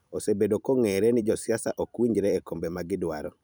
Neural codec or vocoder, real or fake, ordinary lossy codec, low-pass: vocoder, 44.1 kHz, 128 mel bands every 256 samples, BigVGAN v2; fake; none; none